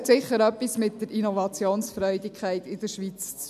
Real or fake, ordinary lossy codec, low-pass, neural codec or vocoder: real; none; 14.4 kHz; none